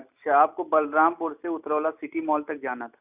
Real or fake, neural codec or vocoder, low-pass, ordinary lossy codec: real; none; 3.6 kHz; none